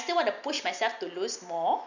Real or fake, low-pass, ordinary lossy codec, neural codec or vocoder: real; 7.2 kHz; none; none